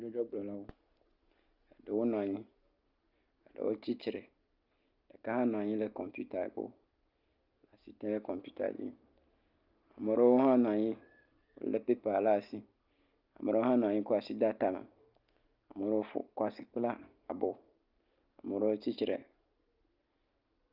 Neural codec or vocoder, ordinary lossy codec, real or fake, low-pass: none; Opus, 24 kbps; real; 5.4 kHz